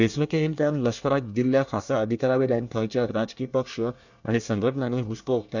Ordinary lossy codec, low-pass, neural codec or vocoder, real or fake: none; 7.2 kHz; codec, 24 kHz, 1 kbps, SNAC; fake